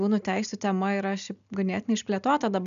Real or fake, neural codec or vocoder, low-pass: real; none; 7.2 kHz